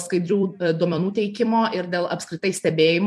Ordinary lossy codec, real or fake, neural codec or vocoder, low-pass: MP3, 64 kbps; fake; vocoder, 44.1 kHz, 128 mel bands every 256 samples, BigVGAN v2; 14.4 kHz